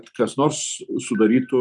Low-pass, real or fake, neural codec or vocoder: 10.8 kHz; real; none